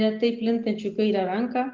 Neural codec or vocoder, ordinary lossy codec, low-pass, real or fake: none; Opus, 24 kbps; 7.2 kHz; real